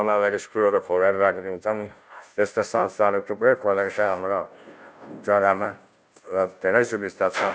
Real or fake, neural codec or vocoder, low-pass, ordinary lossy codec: fake; codec, 16 kHz, 0.5 kbps, FunCodec, trained on Chinese and English, 25 frames a second; none; none